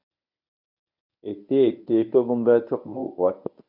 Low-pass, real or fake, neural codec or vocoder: 5.4 kHz; fake; codec, 24 kHz, 0.9 kbps, WavTokenizer, medium speech release version 1